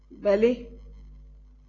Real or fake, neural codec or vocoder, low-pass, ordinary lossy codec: real; none; 7.2 kHz; AAC, 32 kbps